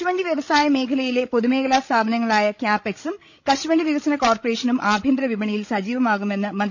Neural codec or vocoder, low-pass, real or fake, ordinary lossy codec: codec, 16 kHz, 16 kbps, FreqCodec, larger model; 7.2 kHz; fake; none